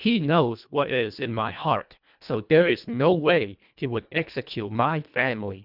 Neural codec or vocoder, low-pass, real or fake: codec, 24 kHz, 1.5 kbps, HILCodec; 5.4 kHz; fake